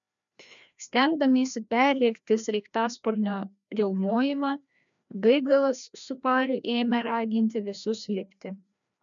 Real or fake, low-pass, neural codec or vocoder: fake; 7.2 kHz; codec, 16 kHz, 1 kbps, FreqCodec, larger model